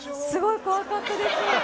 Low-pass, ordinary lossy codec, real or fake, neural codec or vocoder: none; none; real; none